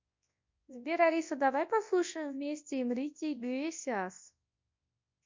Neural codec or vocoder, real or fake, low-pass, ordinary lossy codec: codec, 24 kHz, 0.9 kbps, WavTokenizer, large speech release; fake; 7.2 kHz; MP3, 48 kbps